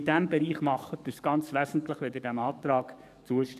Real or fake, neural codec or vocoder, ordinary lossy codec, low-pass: fake; codec, 44.1 kHz, 7.8 kbps, DAC; none; 14.4 kHz